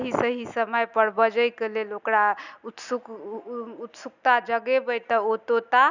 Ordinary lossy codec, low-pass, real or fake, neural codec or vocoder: none; 7.2 kHz; real; none